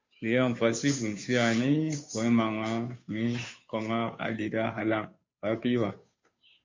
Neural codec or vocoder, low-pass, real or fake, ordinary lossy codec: codec, 16 kHz, 2 kbps, FunCodec, trained on Chinese and English, 25 frames a second; 7.2 kHz; fake; MP3, 48 kbps